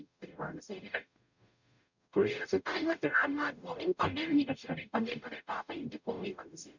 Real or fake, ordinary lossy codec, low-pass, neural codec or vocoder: fake; none; 7.2 kHz; codec, 44.1 kHz, 0.9 kbps, DAC